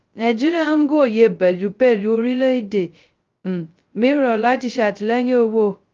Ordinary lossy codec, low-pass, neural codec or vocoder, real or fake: Opus, 32 kbps; 7.2 kHz; codec, 16 kHz, 0.2 kbps, FocalCodec; fake